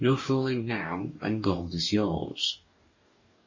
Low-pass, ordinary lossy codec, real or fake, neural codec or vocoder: 7.2 kHz; MP3, 32 kbps; fake; codec, 44.1 kHz, 2.6 kbps, DAC